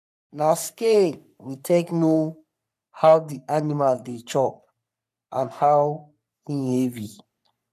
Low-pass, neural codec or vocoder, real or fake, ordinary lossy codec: 14.4 kHz; codec, 44.1 kHz, 3.4 kbps, Pupu-Codec; fake; none